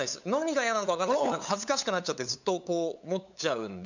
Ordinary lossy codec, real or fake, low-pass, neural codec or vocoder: none; fake; 7.2 kHz; codec, 16 kHz, 8 kbps, FunCodec, trained on LibriTTS, 25 frames a second